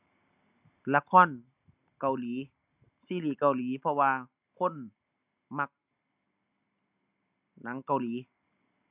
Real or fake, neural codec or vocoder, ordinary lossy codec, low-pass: real; none; none; 3.6 kHz